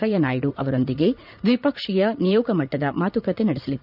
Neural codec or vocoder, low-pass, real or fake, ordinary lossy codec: vocoder, 44.1 kHz, 80 mel bands, Vocos; 5.4 kHz; fake; none